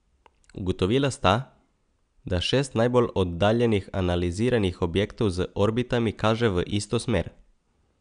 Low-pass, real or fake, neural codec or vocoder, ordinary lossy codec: 9.9 kHz; real; none; none